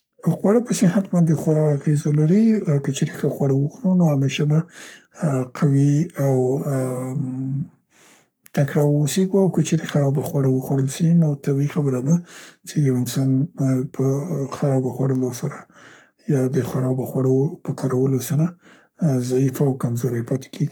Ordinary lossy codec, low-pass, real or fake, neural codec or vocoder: none; none; fake; codec, 44.1 kHz, 3.4 kbps, Pupu-Codec